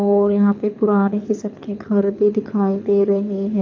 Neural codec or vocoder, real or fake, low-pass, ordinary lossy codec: codec, 24 kHz, 6 kbps, HILCodec; fake; 7.2 kHz; AAC, 48 kbps